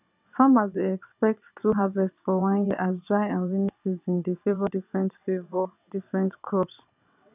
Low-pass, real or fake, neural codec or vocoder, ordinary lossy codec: 3.6 kHz; fake; vocoder, 22.05 kHz, 80 mel bands, WaveNeXt; none